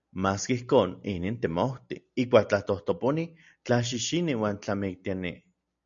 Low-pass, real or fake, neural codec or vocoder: 7.2 kHz; real; none